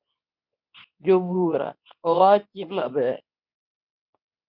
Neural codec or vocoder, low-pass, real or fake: codec, 24 kHz, 0.9 kbps, WavTokenizer, medium speech release version 2; 5.4 kHz; fake